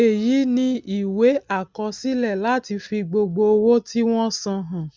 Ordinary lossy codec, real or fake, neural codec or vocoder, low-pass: none; real; none; none